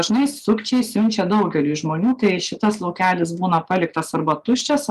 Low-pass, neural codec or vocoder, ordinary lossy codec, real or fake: 14.4 kHz; none; Opus, 32 kbps; real